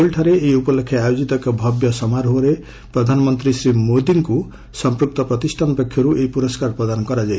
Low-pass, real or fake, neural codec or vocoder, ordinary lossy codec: none; real; none; none